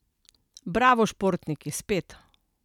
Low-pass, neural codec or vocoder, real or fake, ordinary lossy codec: 19.8 kHz; none; real; none